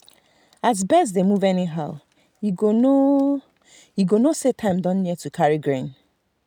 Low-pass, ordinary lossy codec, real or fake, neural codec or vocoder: 19.8 kHz; none; real; none